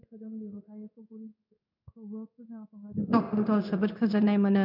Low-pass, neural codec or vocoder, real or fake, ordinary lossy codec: 5.4 kHz; codec, 16 kHz in and 24 kHz out, 1 kbps, XY-Tokenizer; fake; none